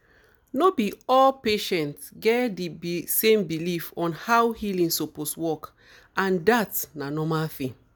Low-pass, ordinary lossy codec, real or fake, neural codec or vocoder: none; none; real; none